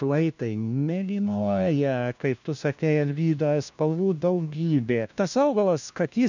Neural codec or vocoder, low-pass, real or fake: codec, 16 kHz, 1 kbps, FunCodec, trained on LibriTTS, 50 frames a second; 7.2 kHz; fake